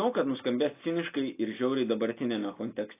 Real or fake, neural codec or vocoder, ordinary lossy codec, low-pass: real; none; AAC, 24 kbps; 3.6 kHz